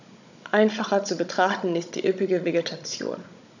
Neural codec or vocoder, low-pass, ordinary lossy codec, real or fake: codec, 16 kHz, 16 kbps, FunCodec, trained on Chinese and English, 50 frames a second; 7.2 kHz; none; fake